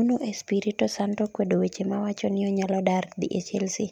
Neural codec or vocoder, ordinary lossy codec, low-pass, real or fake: none; none; 19.8 kHz; real